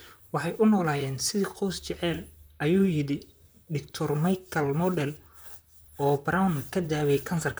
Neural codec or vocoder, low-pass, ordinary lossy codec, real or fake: vocoder, 44.1 kHz, 128 mel bands, Pupu-Vocoder; none; none; fake